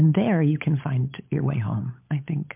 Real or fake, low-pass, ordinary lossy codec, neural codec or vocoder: fake; 3.6 kHz; MP3, 32 kbps; codec, 16 kHz, 16 kbps, FunCodec, trained on LibriTTS, 50 frames a second